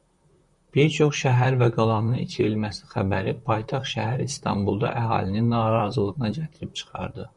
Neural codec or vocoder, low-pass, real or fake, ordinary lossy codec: vocoder, 44.1 kHz, 128 mel bands, Pupu-Vocoder; 10.8 kHz; fake; MP3, 96 kbps